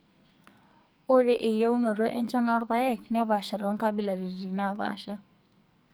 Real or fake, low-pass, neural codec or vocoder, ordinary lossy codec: fake; none; codec, 44.1 kHz, 2.6 kbps, SNAC; none